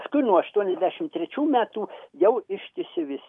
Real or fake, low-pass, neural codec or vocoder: real; 10.8 kHz; none